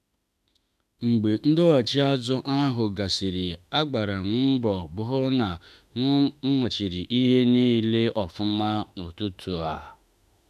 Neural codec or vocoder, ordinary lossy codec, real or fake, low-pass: autoencoder, 48 kHz, 32 numbers a frame, DAC-VAE, trained on Japanese speech; none; fake; 14.4 kHz